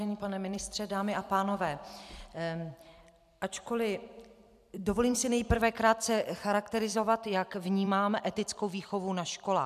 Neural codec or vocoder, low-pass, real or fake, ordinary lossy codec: vocoder, 44.1 kHz, 128 mel bands every 256 samples, BigVGAN v2; 14.4 kHz; fake; MP3, 96 kbps